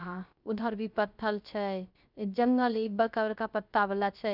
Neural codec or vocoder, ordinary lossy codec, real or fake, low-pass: codec, 16 kHz, 0.3 kbps, FocalCodec; none; fake; 5.4 kHz